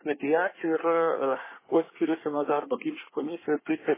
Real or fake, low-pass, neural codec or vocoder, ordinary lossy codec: fake; 3.6 kHz; codec, 24 kHz, 1 kbps, SNAC; MP3, 16 kbps